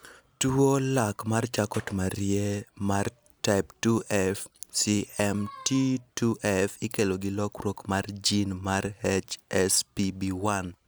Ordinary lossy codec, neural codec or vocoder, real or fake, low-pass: none; none; real; none